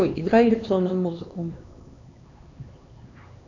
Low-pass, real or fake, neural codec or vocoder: 7.2 kHz; fake; codec, 16 kHz, 2 kbps, X-Codec, HuBERT features, trained on LibriSpeech